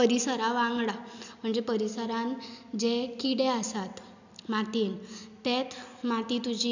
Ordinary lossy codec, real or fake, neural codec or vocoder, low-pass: none; real; none; 7.2 kHz